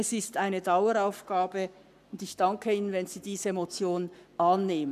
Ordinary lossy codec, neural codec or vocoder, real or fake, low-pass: none; codec, 44.1 kHz, 7.8 kbps, Pupu-Codec; fake; 14.4 kHz